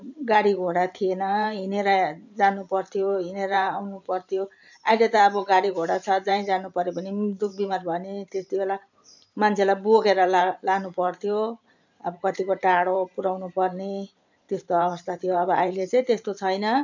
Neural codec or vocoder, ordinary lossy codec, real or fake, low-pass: vocoder, 44.1 kHz, 128 mel bands every 512 samples, BigVGAN v2; none; fake; 7.2 kHz